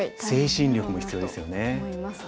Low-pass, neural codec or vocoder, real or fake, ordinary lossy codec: none; none; real; none